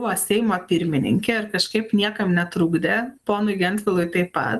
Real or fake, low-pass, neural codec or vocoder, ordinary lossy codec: real; 14.4 kHz; none; Opus, 32 kbps